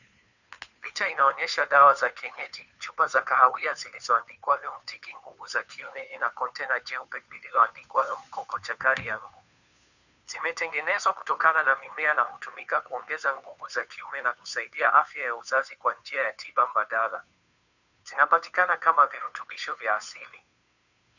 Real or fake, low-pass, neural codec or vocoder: fake; 7.2 kHz; codec, 16 kHz, 2 kbps, FunCodec, trained on Chinese and English, 25 frames a second